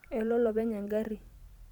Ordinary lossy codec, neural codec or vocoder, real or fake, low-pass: none; vocoder, 44.1 kHz, 128 mel bands every 512 samples, BigVGAN v2; fake; 19.8 kHz